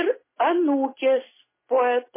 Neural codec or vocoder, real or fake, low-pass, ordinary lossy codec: none; real; 3.6 kHz; MP3, 16 kbps